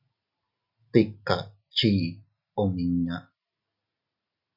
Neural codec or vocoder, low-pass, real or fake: none; 5.4 kHz; real